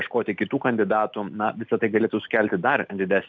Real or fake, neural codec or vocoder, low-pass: real; none; 7.2 kHz